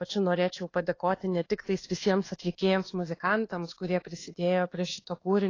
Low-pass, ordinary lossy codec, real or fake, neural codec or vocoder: 7.2 kHz; AAC, 32 kbps; fake; codec, 24 kHz, 1.2 kbps, DualCodec